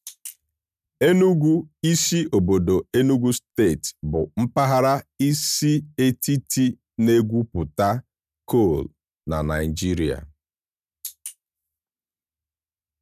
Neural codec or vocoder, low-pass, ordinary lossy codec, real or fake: none; 14.4 kHz; none; real